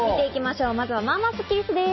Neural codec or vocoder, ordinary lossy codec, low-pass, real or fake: none; MP3, 24 kbps; 7.2 kHz; real